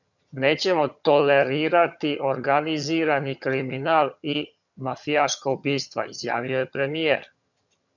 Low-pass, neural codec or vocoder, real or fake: 7.2 kHz; vocoder, 22.05 kHz, 80 mel bands, HiFi-GAN; fake